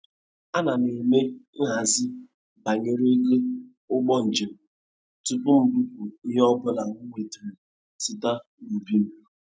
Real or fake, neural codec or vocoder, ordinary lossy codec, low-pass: real; none; none; none